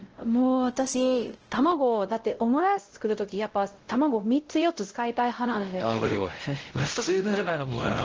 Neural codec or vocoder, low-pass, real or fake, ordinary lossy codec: codec, 16 kHz, 0.5 kbps, X-Codec, WavLM features, trained on Multilingual LibriSpeech; 7.2 kHz; fake; Opus, 16 kbps